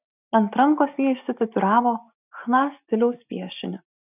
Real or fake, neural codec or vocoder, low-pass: real; none; 3.6 kHz